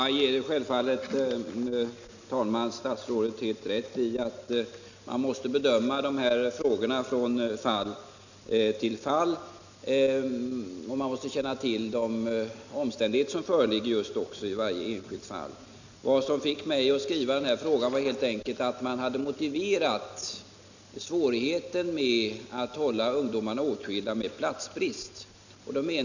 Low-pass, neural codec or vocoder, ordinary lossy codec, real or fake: 7.2 kHz; none; none; real